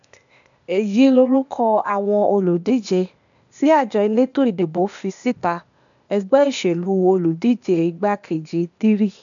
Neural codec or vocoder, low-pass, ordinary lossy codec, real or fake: codec, 16 kHz, 0.8 kbps, ZipCodec; 7.2 kHz; none; fake